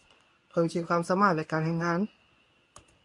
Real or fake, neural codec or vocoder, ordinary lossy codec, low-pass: fake; codec, 24 kHz, 0.9 kbps, WavTokenizer, medium speech release version 1; MP3, 96 kbps; 10.8 kHz